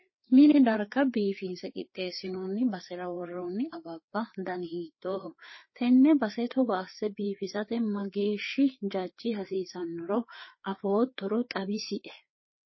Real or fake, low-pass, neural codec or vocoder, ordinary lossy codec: fake; 7.2 kHz; codec, 16 kHz, 4 kbps, FreqCodec, larger model; MP3, 24 kbps